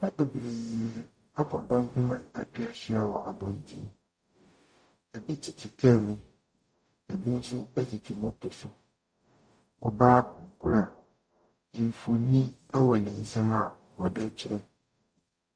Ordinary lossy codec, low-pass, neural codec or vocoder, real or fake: Opus, 64 kbps; 9.9 kHz; codec, 44.1 kHz, 0.9 kbps, DAC; fake